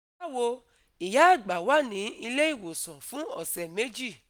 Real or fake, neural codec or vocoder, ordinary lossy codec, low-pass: real; none; none; none